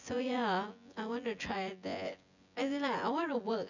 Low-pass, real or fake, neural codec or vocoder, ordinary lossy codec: 7.2 kHz; fake; vocoder, 24 kHz, 100 mel bands, Vocos; none